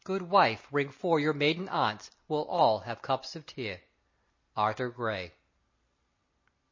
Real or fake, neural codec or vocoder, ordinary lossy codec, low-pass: real; none; MP3, 32 kbps; 7.2 kHz